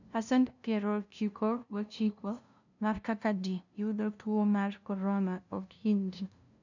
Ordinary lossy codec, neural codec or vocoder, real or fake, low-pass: none; codec, 16 kHz, 0.5 kbps, FunCodec, trained on LibriTTS, 25 frames a second; fake; 7.2 kHz